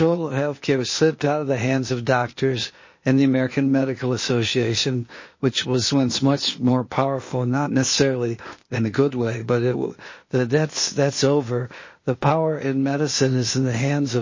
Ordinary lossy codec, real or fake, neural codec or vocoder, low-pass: MP3, 32 kbps; fake; codec, 16 kHz, 0.8 kbps, ZipCodec; 7.2 kHz